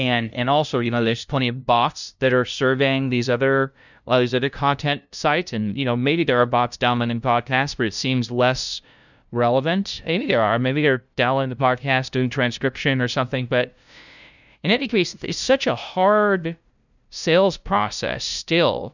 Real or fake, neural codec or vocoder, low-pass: fake; codec, 16 kHz, 0.5 kbps, FunCodec, trained on LibriTTS, 25 frames a second; 7.2 kHz